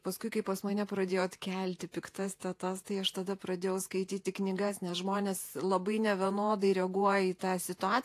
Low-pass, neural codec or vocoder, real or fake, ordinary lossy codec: 14.4 kHz; vocoder, 48 kHz, 128 mel bands, Vocos; fake; AAC, 64 kbps